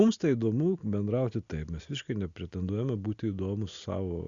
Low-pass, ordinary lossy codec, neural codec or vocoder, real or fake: 7.2 kHz; Opus, 64 kbps; none; real